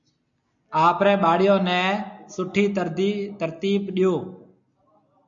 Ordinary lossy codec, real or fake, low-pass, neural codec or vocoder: MP3, 96 kbps; real; 7.2 kHz; none